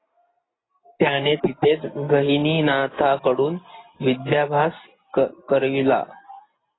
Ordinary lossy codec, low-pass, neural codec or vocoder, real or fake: AAC, 16 kbps; 7.2 kHz; none; real